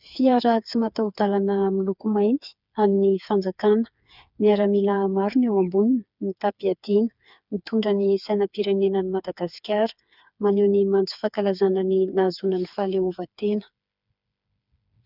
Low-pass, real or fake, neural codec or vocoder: 5.4 kHz; fake; codec, 16 kHz, 4 kbps, FreqCodec, smaller model